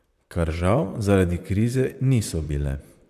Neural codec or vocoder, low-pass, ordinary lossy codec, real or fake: vocoder, 44.1 kHz, 128 mel bands, Pupu-Vocoder; 14.4 kHz; none; fake